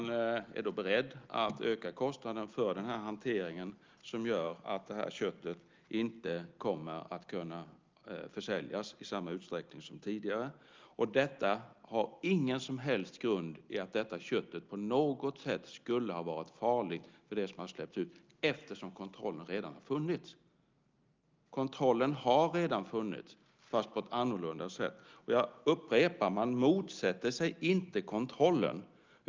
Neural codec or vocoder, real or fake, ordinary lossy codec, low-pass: none; real; Opus, 24 kbps; 7.2 kHz